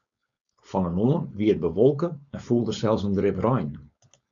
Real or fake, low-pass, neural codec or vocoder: fake; 7.2 kHz; codec, 16 kHz, 4.8 kbps, FACodec